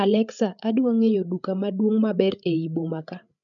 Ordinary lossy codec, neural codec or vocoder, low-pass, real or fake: none; codec, 16 kHz, 8 kbps, FreqCodec, larger model; 7.2 kHz; fake